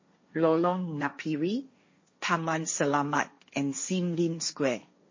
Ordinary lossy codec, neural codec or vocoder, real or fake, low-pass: MP3, 32 kbps; codec, 16 kHz, 1.1 kbps, Voila-Tokenizer; fake; 7.2 kHz